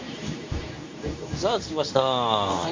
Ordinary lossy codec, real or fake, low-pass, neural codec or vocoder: MP3, 64 kbps; fake; 7.2 kHz; codec, 24 kHz, 0.9 kbps, WavTokenizer, medium speech release version 2